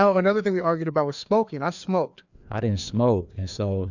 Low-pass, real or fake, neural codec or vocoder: 7.2 kHz; fake; codec, 16 kHz, 2 kbps, FreqCodec, larger model